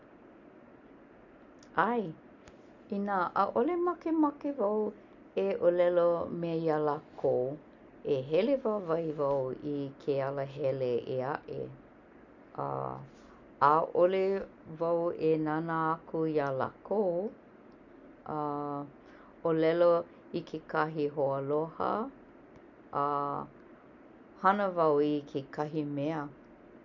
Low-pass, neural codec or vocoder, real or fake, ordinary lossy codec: 7.2 kHz; none; real; none